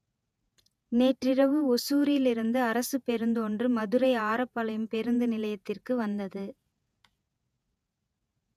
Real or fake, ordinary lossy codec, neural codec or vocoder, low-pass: fake; none; vocoder, 48 kHz, 128 mel bands, Vocos; 14.4 kHz